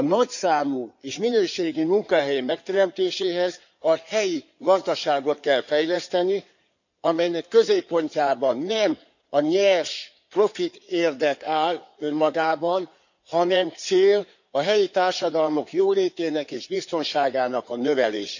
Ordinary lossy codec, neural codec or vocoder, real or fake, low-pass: none; codec, 16 kHz in and 24 kHz out, 2.2 kbps, FireRedTTS-2 codec; fake; 7.2 kHz